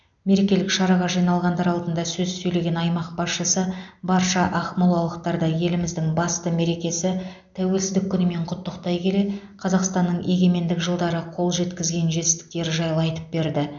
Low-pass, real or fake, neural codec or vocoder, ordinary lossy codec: 7.2 kHz; real; none; none